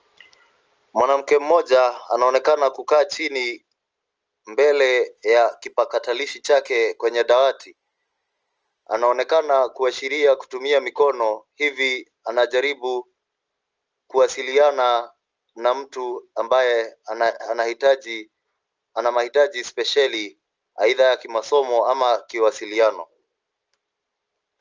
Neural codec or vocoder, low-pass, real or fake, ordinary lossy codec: none; 7.2 kHz; real; Opus, 24 kbps